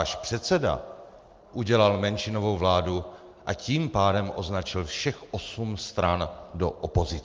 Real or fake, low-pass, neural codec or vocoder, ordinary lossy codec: real; 7.2 kHz; none; Opus, 32 kbps